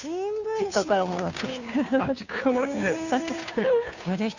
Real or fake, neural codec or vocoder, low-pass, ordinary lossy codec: fake; codec, 16 kHz, 2 kbps, FunCodec, trained on Chinese and English, 25 frames a second; 7.2 kHz; none